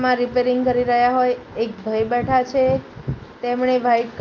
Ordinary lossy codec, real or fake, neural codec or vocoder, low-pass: Opus, 24 kbps; real; none; 7.2 kHz